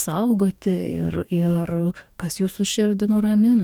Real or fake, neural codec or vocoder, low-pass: fake; codec, 44.1 kHz, 2.6 kbps, DAC; 19.8 kHz